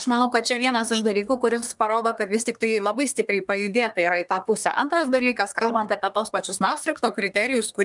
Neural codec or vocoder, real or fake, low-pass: codec, 24 kHz, 1 kbps, SNAC; fake; 10.8 kHz